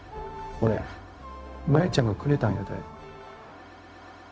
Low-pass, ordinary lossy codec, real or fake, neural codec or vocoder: none; none; fake; codec, 16 kHz, 0.4 kbps, LongCat-Audio-Codec